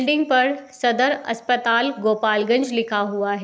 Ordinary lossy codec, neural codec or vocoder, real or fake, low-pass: none; none; real; none